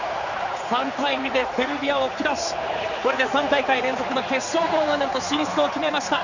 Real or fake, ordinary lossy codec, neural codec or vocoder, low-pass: fake; none; codec, 16 kHz, 4 kbps, X-Codec, HuBERT features, trained on general audio; 7.2 kHz